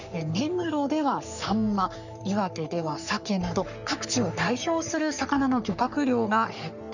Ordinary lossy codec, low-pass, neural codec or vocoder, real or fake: none; 7.2 kHz; codec, 44.1 kHz, 3.4 kbps, Pupu-Codec; fake